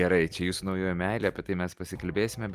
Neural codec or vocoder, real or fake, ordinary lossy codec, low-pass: none; real; Opus, 32 kbps; 14.4 kHz